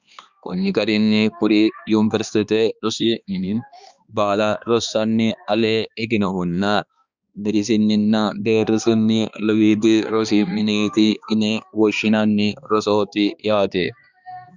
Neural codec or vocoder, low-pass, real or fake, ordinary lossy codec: codec, 16 kHz, 2 kbps, X-Codec, HuBERT features, trained on balanced general audio; 7.2 kHz; fake; Opus, 64 kbps